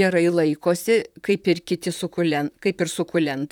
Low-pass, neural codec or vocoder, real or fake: 19.8 kHz; vocoder, 44.1 kHz, 128 mel bands, Pupu-Vocoder; fake